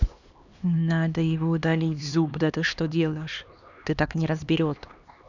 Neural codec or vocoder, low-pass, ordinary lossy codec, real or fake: codec, 16 kHz, 2 kbps, X-Codec, HuBERT features, trained on LibriSpeech; 7.2 kHz; none; fake